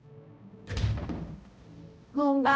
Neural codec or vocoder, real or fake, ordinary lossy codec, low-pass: codec, 16 kHz, 0.5 kbps, X-Codec, HuBERT features, trained on balanced general audio; fake; none; none